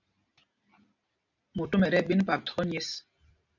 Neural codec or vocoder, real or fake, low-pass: none; real; 7.2 kHz